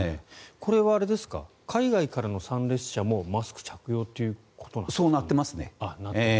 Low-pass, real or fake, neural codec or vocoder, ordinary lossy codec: none; real; none; none